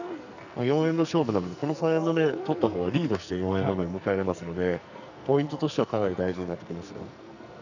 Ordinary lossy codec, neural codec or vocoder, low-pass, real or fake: none; codec, 44.1 kHz, 2.6 kbps, SNAC; 7.2 kHz; fake